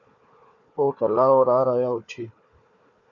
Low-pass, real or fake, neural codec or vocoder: 7.2 kHz; fake; codec, 16 kHz, 4 kbps, FunCodec, trained on Chinese and English, 50 frames a second